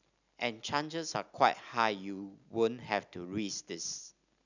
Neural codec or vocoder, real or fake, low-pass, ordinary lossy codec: none; real; 7.2 kHz; none